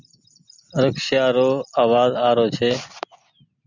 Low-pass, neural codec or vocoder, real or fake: 7.2 kHz; none; real